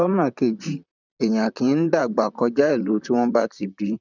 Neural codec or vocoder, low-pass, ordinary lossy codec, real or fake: vocoder, 44.1 kHz, 128 mel bands, Pupu-Vocoder; 7.2 kHz; none; fake